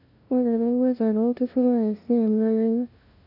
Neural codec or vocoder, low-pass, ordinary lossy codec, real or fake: codec, 16 kHz, 0.5 kbps, FunCodec, trained on LibriTTS, 25 frames a second; 5.4 kHz; none; fake